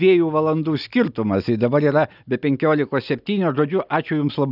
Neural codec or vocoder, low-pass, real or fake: none; 5.4 kHz; real